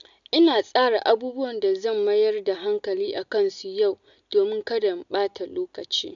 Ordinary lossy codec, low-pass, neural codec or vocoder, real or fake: none; 7.2 kHz; none; real